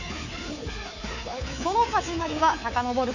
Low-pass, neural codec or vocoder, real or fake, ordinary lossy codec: 7.2 kHz; codec, 24 kHz, 3.1 kbps, DualCodec; fake; none